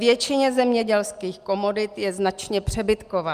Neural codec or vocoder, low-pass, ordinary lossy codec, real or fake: none; 14.4 kHz; Opus, 32 kbps; real